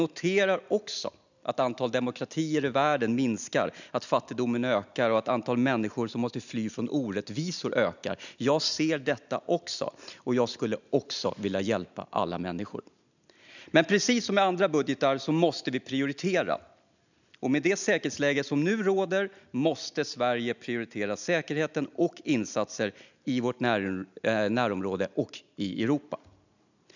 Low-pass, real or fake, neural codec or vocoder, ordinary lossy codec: 7.2 kHz; real; none; none